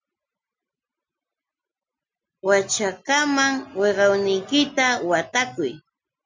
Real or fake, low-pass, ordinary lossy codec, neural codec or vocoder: real; 7.2 kHz; MP3, 64 kbps; none